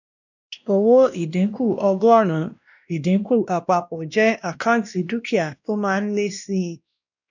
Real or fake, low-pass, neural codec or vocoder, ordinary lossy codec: fake; 7.2 kHz; codec, 16 kHz, 1 kbps, X-Codec, WavLM features, trained on Multilingual LibriSpeech; none